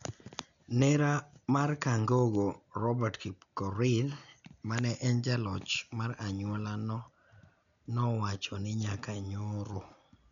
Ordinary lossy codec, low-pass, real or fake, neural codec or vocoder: none; 7.2 kHz; real; none